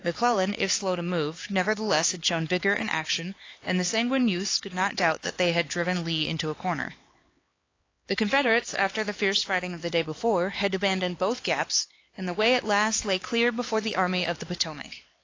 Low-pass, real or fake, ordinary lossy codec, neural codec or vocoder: 7.2 kHz; fake; AAC, 32 kbps; codec, 16 kHz, 4 kbps, X-Codec, HuBERT features, trained on LibriSpeech